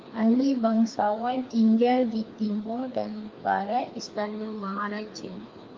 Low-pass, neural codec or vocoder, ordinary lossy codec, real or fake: 7.2 kHz; codec, 16 kHz, 2 kbps, FreqCodec, larger model; Opus, 24 kbps; fake